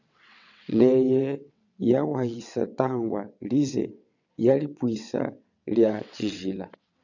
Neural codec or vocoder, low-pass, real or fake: vocoder, 22.05 kHz, 80 mel bands, WaveNeXt; 7.2 kHz; fake